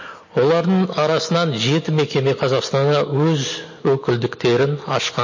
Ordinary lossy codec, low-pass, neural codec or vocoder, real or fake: MP3, 32 kbps; 7.2 kHz; none; real